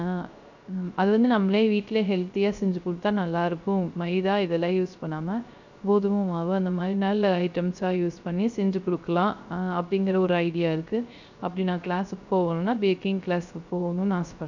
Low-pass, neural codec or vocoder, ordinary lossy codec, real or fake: 7.2 kHz; codec, 16 kHz, 0.3 kbps, FocalCodec; none; fake